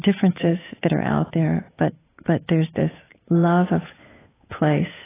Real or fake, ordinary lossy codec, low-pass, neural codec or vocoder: fake; AAC, 16 kbps; 3.6 kHz; codec, 16 kHz, 8 kbps, FunCodec, trained on Chinese and English, 25 frames a second